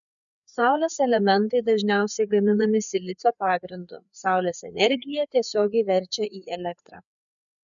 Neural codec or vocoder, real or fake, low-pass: codec, 16 kHz, 4 kbps, FreqCodec, larger model; fake; 7.2 kHz